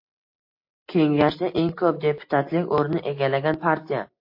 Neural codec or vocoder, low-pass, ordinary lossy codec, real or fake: none; 5.4 kHz; MP3, 32 kbps; real